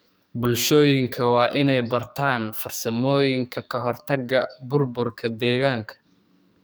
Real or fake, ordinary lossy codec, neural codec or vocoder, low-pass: fake; none; codec, 44.1 kHz, 2.6 kbps, SNAC; none